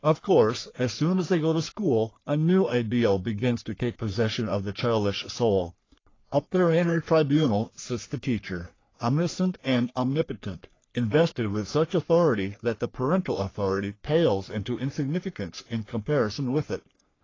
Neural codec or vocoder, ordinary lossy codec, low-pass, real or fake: codec, 44.1 kHz, 3.4 kbps, Pupu-Codec; AAC, 32 kbps; 7.2 kHz; fake